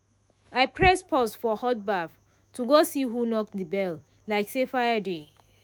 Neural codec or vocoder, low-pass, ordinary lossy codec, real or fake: autoencoder, 48 kHz, 128 numbers a frame, DAC-VAE, trained on Japanese speech; none; none; fake